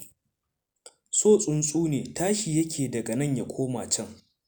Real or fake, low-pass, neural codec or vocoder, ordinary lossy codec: real; none; none; none